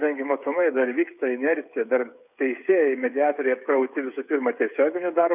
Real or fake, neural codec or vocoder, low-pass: fake; codec, 16 kHz, 8 kbps, FreqCodec, smaller model; 3.6 kHz